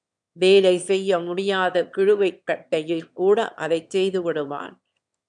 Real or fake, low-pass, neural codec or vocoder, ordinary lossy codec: fake; 9.9 kHz; autoencoder, 22.05 kHz, a latent of 192 numbers a frame, VITS, trained on one speaker; MP3, 96 kbps